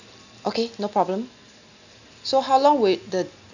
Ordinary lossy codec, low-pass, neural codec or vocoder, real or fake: none; 7.2 kHz; none; real